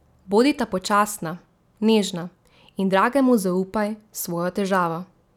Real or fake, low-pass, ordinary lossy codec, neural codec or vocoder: real; 19.8 kHz; none; none